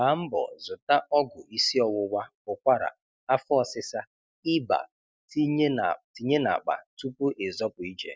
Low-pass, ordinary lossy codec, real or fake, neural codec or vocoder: none; none; real; none